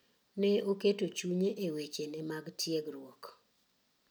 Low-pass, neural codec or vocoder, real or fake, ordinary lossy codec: none; none; real; none